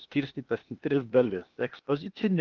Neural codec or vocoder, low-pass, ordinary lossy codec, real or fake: codec, 16 kHz, 0.8 kbps, ZipCodec; 7.2 kHz; Opus, 32 kbps; fake